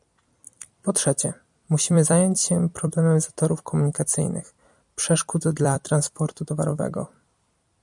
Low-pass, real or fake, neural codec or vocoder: 10.8 kHz; fake; vocoder, 44.1 kHz, 128 mel bands every 256 samples, BigVGAN v2